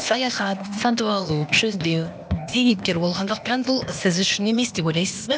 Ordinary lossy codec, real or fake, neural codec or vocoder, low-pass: none; fake; codec, 16 kHz, 0.8 kbps, ZipCodec; none